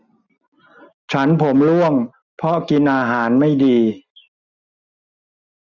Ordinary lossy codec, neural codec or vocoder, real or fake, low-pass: none; none; real; 7.2 kHz